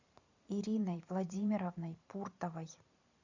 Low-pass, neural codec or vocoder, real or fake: 7.2 kHz; vocoder, 44.1 kHz, 128 mel bands every 512 samples, BigVGAN v2; fake